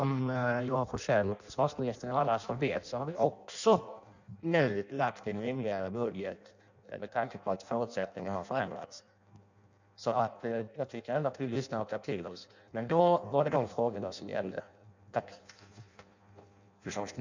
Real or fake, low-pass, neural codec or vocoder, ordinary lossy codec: fake; 7.2 kHz; codec, 16 kHz in and 24 kHz out, 0.6 kbps, FireRedTTS-2 codec; none